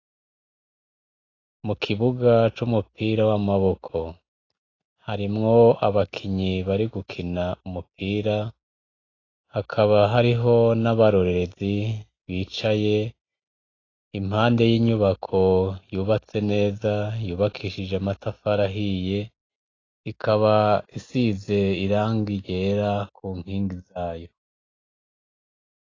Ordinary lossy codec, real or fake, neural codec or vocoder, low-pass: AAC, 32 kbps; real; none; 7.2 kHz